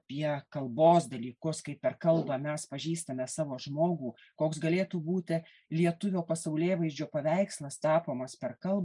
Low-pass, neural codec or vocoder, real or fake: 10.8 kHz; none; real